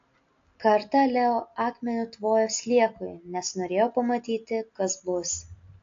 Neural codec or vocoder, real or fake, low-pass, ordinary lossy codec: none; real; 7.2 kHz; AAC, 48 kbps